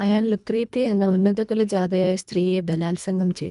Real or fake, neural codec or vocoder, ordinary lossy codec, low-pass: fake; codec, 24 kHz, 1.5 kbps, HILCodec; none; 10.8 kHz